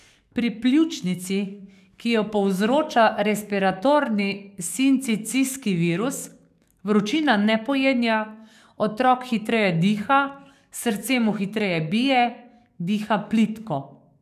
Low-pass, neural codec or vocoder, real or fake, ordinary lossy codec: 14.4 kHz; codec, 44.1 kHz, 7.8 kbps, DAC; fake; none